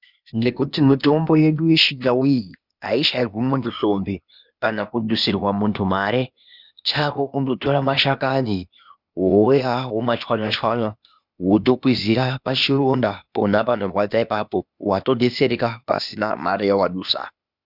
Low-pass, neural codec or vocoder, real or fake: 5.4 kHz; codec, 16 kHz, 0.8 kbps, ZipCodec; fake